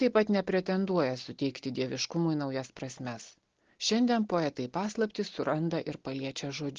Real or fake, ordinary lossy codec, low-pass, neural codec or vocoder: real; Opus, 16 kbps; 7.2 kHz; none